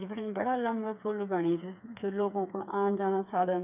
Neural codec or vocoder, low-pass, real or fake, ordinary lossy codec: codec, 16 kHz, 4 kbps, FreqCodec, smaller model; 3.6 kHz; fake; none